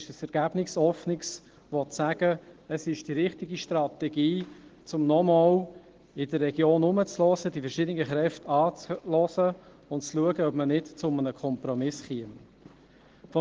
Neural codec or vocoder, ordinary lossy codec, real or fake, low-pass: none; Opus, 16 kbps; real; 7.2 kHz